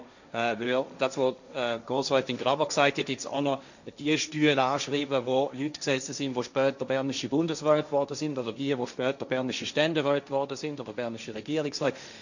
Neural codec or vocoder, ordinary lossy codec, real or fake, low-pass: codec, 16 kHz, 1.1 kbps, Voila-Tokenizer; none; fake; 7.2 kHz